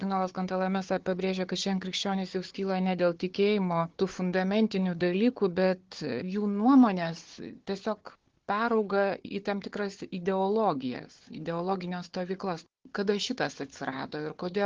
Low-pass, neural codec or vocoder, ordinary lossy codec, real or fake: 7.2 kHz; codec, 16 kHz, 4 kbps, FunCodec, trained on Chinese and English, 50 frames a second; Opus, 16 kbps; fake